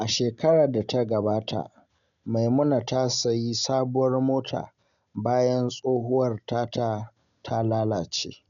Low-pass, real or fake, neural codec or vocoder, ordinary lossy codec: 7.2 kHz; real; none; none